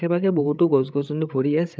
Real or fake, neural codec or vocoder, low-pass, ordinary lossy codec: fake; codec, 16 kHz, 8 kbps, FreqCodec, larger model; none; none